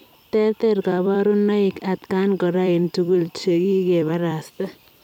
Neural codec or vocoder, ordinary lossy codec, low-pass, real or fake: vocoder, 44.1 kHz, 128 mel bands every 256 samples, BigVGAN v2; none; 19.8 kHz; fake